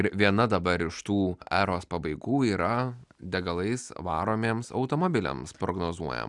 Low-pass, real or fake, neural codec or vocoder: 10.8 kHz; real; none